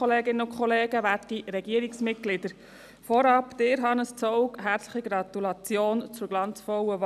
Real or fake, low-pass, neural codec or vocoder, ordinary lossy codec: real; 14.4 kHz; none; none